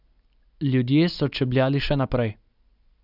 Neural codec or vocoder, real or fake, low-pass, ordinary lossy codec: none; real; 5.4 kHz; none